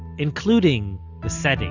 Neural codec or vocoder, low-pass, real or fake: none; 7.2 kHz; real